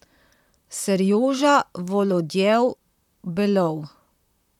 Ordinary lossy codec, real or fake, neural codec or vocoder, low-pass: none; fake; vocoder, 44.1 kHz, 128 mel bands, Pupu-Vocoder; 19.8 kHz